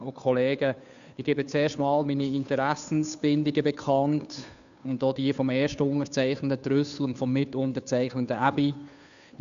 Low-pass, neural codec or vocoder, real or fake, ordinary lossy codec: 7.2 kHz; codec, 16 kHz, 2 kbps, FunCodec, trained on Chinese and English, 25 frames a second; fake; MP3, 96 kbps